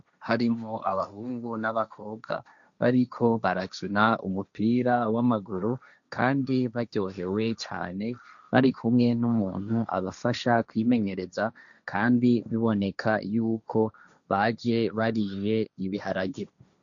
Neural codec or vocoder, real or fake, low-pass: codec, 16 kHz, 1.1 kbps, Voila-Tokenizer; fake; 7.2 kHz